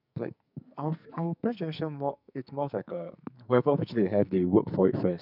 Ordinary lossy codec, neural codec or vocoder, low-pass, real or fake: none; codec, 44.1 kHz, 2.6 kbps, SNAC; 5.4 kHz; fake